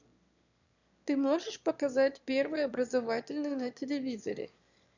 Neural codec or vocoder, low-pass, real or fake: autoencoder, 22.05 kHz, a latent of 192 numbers a frame, VITS, trained on one speaker; 7.2 kHz; fake